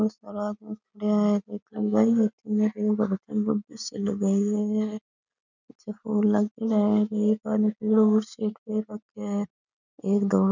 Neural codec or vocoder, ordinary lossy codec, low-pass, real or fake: none; none; 7.2 kHz; real